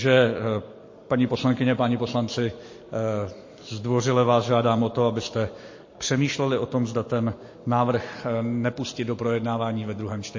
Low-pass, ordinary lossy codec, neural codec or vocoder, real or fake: 7.2 kHz; MP3, 32 kbps; none; real